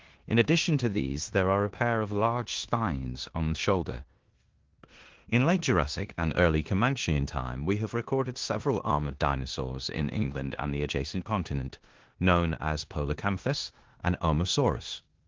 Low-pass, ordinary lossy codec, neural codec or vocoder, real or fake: 7.2 kHz; Opus, 16 kbps; codec, 16 kHz in and 24 kHz out, 0.9 kbps, LongCat-Audio-Codec, four codebook decoder; fake